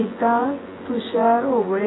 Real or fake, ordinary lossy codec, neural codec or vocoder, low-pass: fake; AAC, 16 kbps; vocoder, 24 kHz, 100 mel bands, Vocos; 7.2 kHz